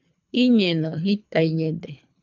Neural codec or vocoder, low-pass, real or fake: codec, 24 kHz, 3 kbps, HILCodec; 7.2 kHz; fake